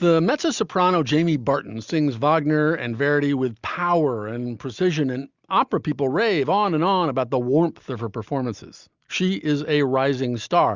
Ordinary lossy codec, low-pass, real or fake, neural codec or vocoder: Opus, 64 kbps; 7.2 kHz; real; none